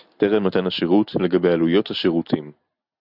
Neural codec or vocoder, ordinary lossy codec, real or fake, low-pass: none; Opus, 64 kbps; real; 5.4 kHz